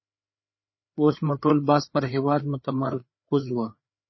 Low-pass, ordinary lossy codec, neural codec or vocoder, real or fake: 7.2 kHz; MP3, 24 kbps; codec, 16 kHz, 4 kbps, FreqCodec, larger model; fake